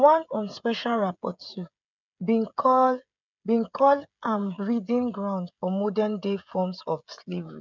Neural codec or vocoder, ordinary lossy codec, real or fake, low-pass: codec, 16 kHz, 16 kbps, FreqCodec, smaller model; none; fake; 7.2 kHz